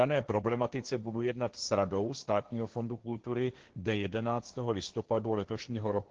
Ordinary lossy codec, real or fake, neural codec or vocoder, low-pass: Opus, 16 kbps; fake; codec, 16 kHz, 1.1 kbps, Voila-Tokenizer; 7.2 kHz